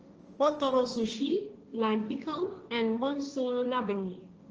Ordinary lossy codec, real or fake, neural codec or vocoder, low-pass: Opus, 24 kbps; fake; codec, 16 kHz, 1.1 kbps, Voila-Tokenizer; 7.2 kHz